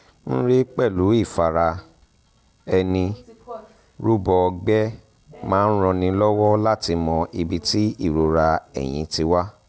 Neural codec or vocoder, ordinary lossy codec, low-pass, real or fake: none; none; none; real